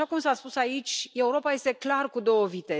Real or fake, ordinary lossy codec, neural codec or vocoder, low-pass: real; none; none; none